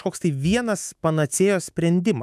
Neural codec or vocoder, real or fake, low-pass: autoencoder, 48 kHz, 128 numbers a frame, DAC-VAE, trained on Japanese speech; fake; 14.4 kHz